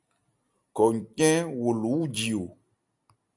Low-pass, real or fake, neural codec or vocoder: 10.8 kHz; real; none